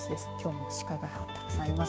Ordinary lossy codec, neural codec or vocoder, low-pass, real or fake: none; codec, 16 kHz, 6 kbps, DAC; none; fake